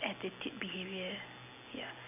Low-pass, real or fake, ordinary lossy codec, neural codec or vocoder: 3.6 kHz; real; none; none